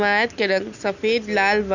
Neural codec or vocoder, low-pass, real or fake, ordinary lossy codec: none; 7.2 kHz; real; none